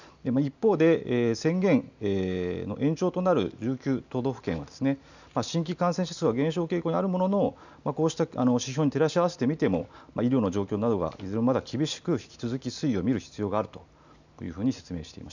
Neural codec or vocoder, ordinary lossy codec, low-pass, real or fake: none; none; 7.2 kHz; real